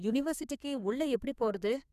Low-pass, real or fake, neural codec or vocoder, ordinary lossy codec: 14.4 kHz; fake; codec, 32 kHz, 1.9 kbps, SNAC; none